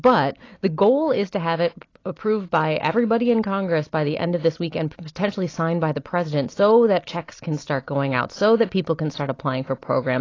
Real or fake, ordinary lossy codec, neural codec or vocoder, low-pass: real; AAC, 32 kbps; none; 7.2 kHz